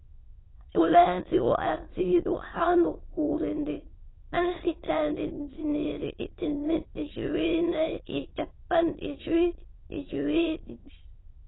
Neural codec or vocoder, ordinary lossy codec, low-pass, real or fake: autoencoder, 22.05 kHz, a latent of 192 numbers a frame, VITS, trained on many speakers; AAC, 16 kbps; 7.2 kHz; fake